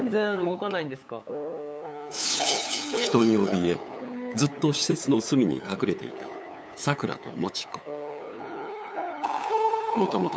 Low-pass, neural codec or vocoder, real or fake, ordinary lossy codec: none; codec, 16 kHz, 8 kbps, FunCodec, trained on LibriTTS, 25 frames a second; fake; none